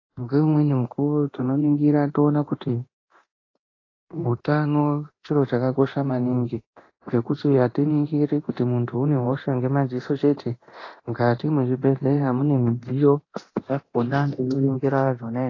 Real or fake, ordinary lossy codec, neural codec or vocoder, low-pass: fake; AAC, 32 kbps; codec, 24 kHz, 0.9 kbps, DualCodec; 7.2 kHz